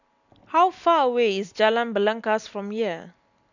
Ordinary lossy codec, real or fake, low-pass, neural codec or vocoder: none; real; 7.2 kHz; none